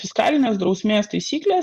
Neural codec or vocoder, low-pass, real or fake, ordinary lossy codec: none; 14.4 kHz; real; Opus, 64 kbps